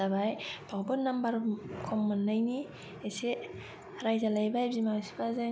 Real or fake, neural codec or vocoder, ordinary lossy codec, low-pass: real; none; none; none